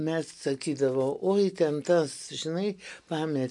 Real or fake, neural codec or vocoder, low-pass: real; none; 10.8 kHz